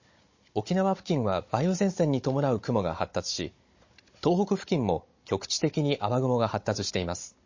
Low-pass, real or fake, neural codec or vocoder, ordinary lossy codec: 7.2 kHz; fake; codec, 16 kHz, 16 kbps, FunCodec, trained on Chinese and English, 50 frames a second; MP3, 32 kbps